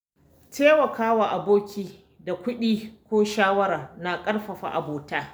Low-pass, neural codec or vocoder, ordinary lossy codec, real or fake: none; none; none; real